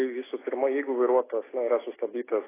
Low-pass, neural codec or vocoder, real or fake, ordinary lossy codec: 3.6 kHz; none; real; AAC, 16 kbps